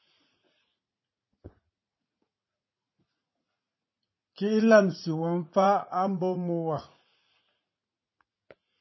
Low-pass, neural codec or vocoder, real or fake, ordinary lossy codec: 7.2 kHz; vocoder, 44.1 kHz, 80 mel bands, Vocos; fake; MP3, 24 kbps